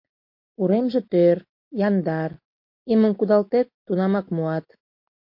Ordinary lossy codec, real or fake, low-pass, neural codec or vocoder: MP3, 32 kbps; real; 5.4 kHz; none